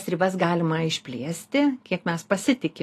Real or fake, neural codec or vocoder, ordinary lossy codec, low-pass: real; none; AAC, 48 kbps; 14.4 kHz